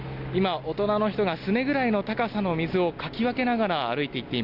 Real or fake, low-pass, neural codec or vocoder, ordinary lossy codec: real; 5.4 kHz; none; none